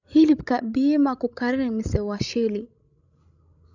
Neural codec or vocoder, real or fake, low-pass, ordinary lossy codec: codec, 16 kHz, 16 kbps, FreqCodec, larger model; fake; 7.2 kHz; none